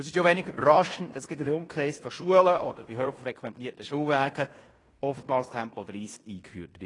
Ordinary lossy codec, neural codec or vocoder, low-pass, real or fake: AAC, 32 kbps; codec, 16 kHz in and 24 kHz out, 0.9 kbps, LongCat-Audio-Codec, fine tuned four codebook decoder; 10.8 kHz; fake